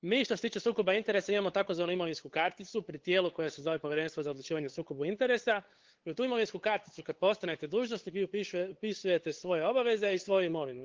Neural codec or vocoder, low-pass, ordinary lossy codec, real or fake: codec, 16 kHz, 8 kbps, FunCodec, trained on LibriTTS, 25 frames a second; 7.2 kHz; Opus, 16 kbps; fake